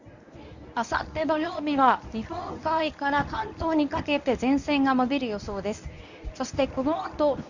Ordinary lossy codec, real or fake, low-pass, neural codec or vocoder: none; fake; 7.2 kHz; codec, 24 kHz, 0.9 kbps, WavTokenizer, medium speech release version 1